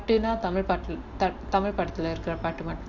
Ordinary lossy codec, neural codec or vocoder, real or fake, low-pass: AAC, 48 kbps; none; real; 7.2 kHz